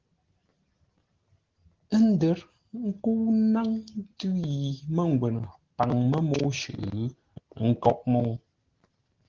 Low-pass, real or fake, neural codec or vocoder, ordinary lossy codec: 7.2 kHz; real; none; Opus, 16 kbps